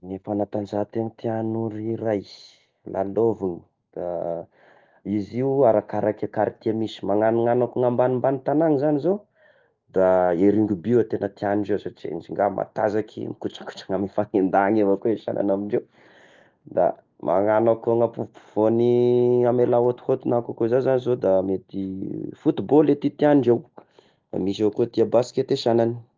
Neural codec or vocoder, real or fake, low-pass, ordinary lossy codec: none; real; 7.2 kHz; Opus, 32 kbps